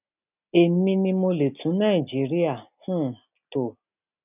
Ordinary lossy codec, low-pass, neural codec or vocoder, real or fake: none; 3.6 kHz; none; real